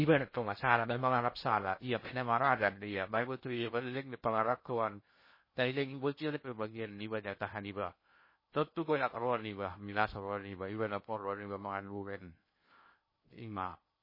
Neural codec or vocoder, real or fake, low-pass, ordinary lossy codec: codec, 16 kHz in and 24 kHz out, 0.6 kbps, FocalCodec, streaming, 2048 codes; fake; 5.4 kHz; MP3, 24 kbps